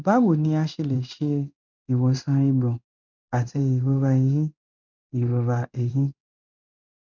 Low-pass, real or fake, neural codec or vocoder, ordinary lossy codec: 7.2 kHz; fake; codec, 16 kHz in and 24 kHz out, 1 kbps, XY-Tokenizer; none